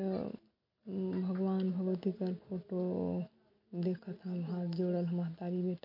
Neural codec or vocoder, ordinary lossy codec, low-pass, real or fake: none; MP3, 32 kbps; 5.4 kHz; real